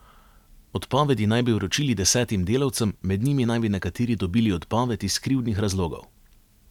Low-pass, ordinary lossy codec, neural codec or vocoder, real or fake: 19.8 kHz; none; none; real